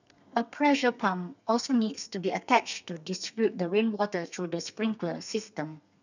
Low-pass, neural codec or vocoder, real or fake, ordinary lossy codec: 7.2 kHz; codec, 32 kHz, 1.9 kbps, SNAC; fake; none